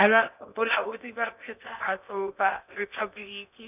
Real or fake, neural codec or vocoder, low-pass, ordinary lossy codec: fake; codec, 16 kHz in and 24 kHz out, 0.8 kbps, FocalCodec, streaming, 65536 codes; 3.6 kHz; none